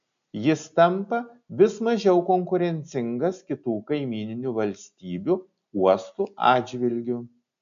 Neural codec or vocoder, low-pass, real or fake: none; 7.2 kHz; real